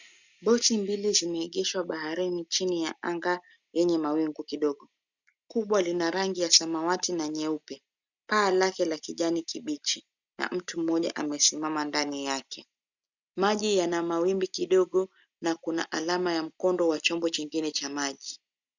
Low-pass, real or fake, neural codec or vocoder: 7.2 kHz; real; none